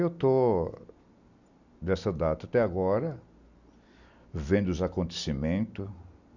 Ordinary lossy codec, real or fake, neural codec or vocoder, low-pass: none; real; none; 7.2 kHz